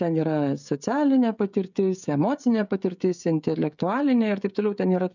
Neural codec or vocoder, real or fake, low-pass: codec, 16 kHz, 16 kbps, FreqCodec, smaller model; fake; 7.2 kHz